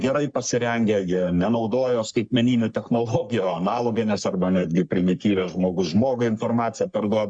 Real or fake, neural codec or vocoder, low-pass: fake; codec, 44.1 kHz, 3.4 kbps, Pupu-Codec; 9.9 kHz